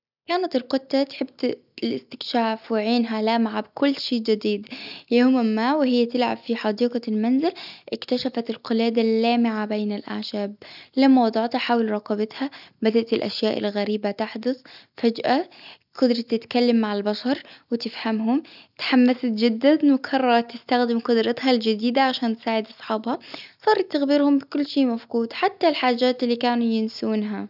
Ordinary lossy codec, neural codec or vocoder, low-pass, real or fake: none; none; 5.4 kHz; real